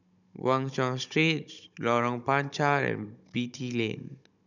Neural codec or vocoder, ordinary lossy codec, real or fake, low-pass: codec, 16 kHz, 16 kbps, FunCodec, trained on Chinese and English, 50 frames a second; none; fake; 7.2 kHz